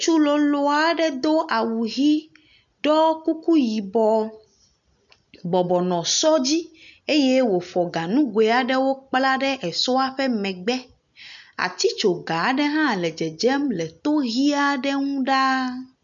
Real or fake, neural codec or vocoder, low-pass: real; none; 7.2 kHz